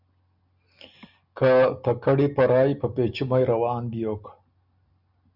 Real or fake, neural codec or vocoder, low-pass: real; none; 5.4 kHz